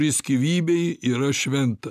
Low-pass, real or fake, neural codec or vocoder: 14.4 kHz; real; none